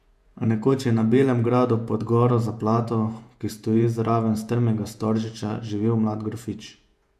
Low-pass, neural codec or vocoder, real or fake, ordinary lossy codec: 14.4 kHz; vocoder, 48 kHz, 128 mel bands, Vocos; fake; MP3, 96 kbps